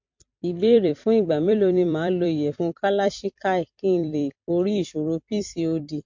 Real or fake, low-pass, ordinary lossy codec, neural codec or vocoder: fake; 7.2 kHz; MP3, 48 kbps; vocoder, 44.1 kHz, 128 mel bands every 256 samples, BigVGAN v2